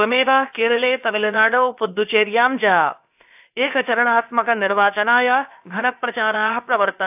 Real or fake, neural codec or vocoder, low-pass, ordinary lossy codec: fake; codec, 16 kHz, about 1 kbps, DyCAST, with the encoder's durations; 3.6 kHz; AAC, 32 kbps